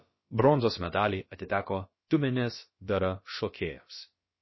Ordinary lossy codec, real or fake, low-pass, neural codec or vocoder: MP3, 24 kbps; fake; 7.2 kHz; codec, 16 kHz, about 1 kbps, DyCAST, with the encoder's durations